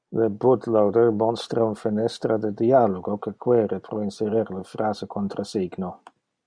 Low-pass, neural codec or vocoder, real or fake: 9.9 kHz; none; real